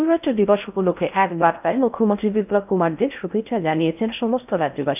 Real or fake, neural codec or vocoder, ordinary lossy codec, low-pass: fake; codec, 16 kHz in and 24 kHz out, 0.6 kbps, FocalCodec, streaming, 4096 codes; none; 3.6 kHz